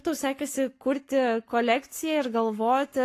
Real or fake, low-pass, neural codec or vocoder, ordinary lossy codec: fake; 14.4 kHz; codec, 44.1 kHz, 7.8 kbps, Pupu-Codec; AAC, 48 kbps